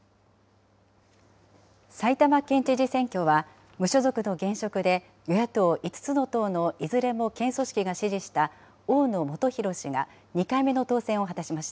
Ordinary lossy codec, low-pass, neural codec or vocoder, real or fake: none; none; none; real